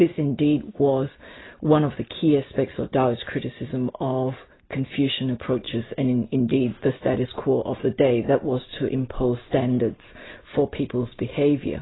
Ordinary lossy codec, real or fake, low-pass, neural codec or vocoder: AAC, 16 kbps; real; 7.2 kHz; none